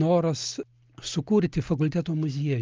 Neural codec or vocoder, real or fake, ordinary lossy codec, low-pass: none; real; Opus, 24 kbps; 7.2 kHz